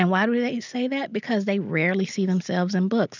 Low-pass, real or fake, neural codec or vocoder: 7.2 kHz; real; none